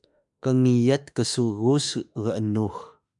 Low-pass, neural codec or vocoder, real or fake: 10.8 kHz; autoencoder, 48 kHz, 32 numbers a frame, DAC-VAE, trained on Japanese speech; fake